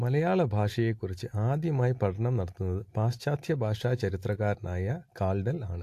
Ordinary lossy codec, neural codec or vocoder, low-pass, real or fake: AAC, 64 kbps; none; 14.4 kHz; real